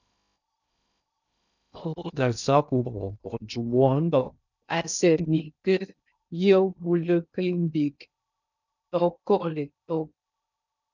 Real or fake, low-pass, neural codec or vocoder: fake; 7.2 kHz; codec, 16 kHz in and 24 kHz out, 0.6 kbps, FocalCodec, streaming, 2048 codes